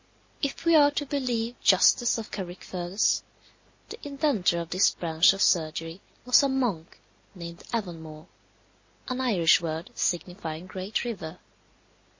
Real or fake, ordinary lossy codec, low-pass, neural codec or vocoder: real; MP3, 32 kbps; 7.2 kHz; none